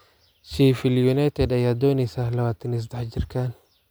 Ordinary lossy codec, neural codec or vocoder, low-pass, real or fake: none; none; none; real